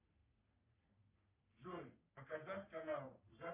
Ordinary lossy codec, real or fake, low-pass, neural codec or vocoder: Opus, 64 kbps; fake; 3.6 kHz; codec, 44.1 kHz, 3.4 kbps, Pupu-Codec